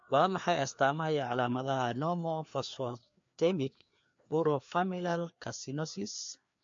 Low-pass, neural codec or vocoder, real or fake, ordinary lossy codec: 7.2 kHz; codec, 16 kHz, 2 kbps, FreqCodec, larger model; fake; MP3, 48 kbps